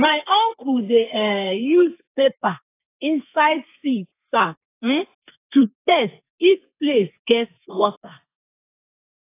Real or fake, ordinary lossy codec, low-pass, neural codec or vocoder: fake; AAC, 24 kbps; 3.6 kHz; codec, 32 kHz, 1.9 kbps, SNAC